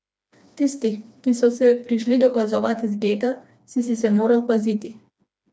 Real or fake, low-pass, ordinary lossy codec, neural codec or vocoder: fake; none; none; codec, 16 kHz, 2 kbps, FreqCodec, smaller model